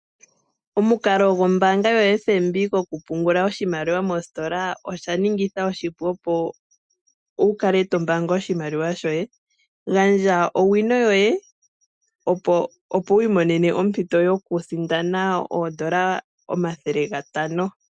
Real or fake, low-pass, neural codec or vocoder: real; 9.9 kHz; none